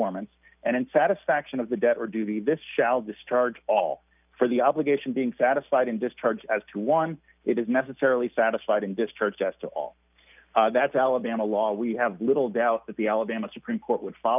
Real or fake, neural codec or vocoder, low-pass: real; none; 3.6 kHz